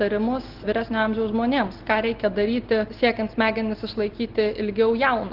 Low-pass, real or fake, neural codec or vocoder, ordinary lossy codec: 5.4 kHz; real; none; Opus, 16 kbps